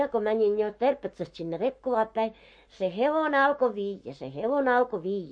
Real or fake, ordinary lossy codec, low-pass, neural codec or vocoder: fake; MP3, 48 kbps; 9.9 kHz; codec, 44.1 kHz, 7.8 kbps, Pupu-Codec